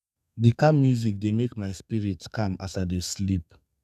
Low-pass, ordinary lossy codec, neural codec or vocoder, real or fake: 14.4 kHz; none; codec, 32 kHz, 1.9 kbps, SNAC; fake